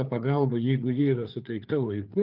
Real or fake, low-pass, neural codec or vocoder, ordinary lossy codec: fake; 5.4 kHz; codec, 16 kHz, 4 kbps, FreqCodec, larger model; Opus, 32 kbps